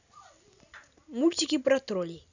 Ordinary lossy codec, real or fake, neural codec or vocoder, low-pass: none; real; none; 7.2 kHz